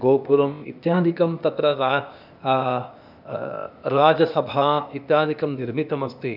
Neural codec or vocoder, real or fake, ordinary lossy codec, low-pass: codec, 16 kHz, 0.8 kbps, ZipCodec; fake; none; 5.4 kHz